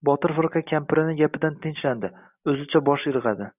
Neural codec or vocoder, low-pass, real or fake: none; 3.6 kHz; real